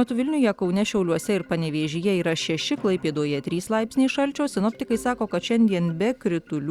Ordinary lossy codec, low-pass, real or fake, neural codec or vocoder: Opus, 64 kbps; 19.8 kHz; real; none